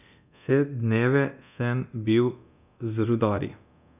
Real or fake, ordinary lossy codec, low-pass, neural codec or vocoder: fake; none; 3.6 kHz; codec, 24 kHz, 0.9 kbps, DualCodec